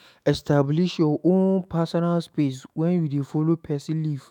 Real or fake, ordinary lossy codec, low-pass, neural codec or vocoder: fake; none; 19.8 kHz; autoencoder, 48 kHz, 128 numbers a frame, DAC-VAE, trained on Japanese speech